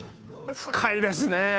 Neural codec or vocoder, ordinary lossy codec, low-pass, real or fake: codec, 16 kHz, 2 kbps, FunCodec, trained on Chinese and English, 25 frames a second; none; none; fake